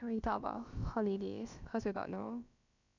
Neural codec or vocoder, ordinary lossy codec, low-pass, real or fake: codec, 16 kHz, about 1 kbps, DyCAST, with the encoder's durations; none; 7.2 kHz; fake